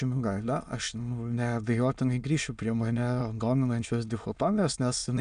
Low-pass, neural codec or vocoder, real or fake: 9.9 kHz; autoencoder, 22.05 kHz, a latent of 192 numbers a frame, VITS, trained on many speakers; fake